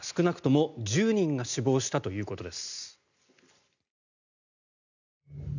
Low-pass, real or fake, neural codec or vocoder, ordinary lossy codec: 7.2 kHz; real; none; none